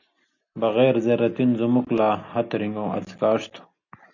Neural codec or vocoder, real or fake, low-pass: none; real; 7.2 kHz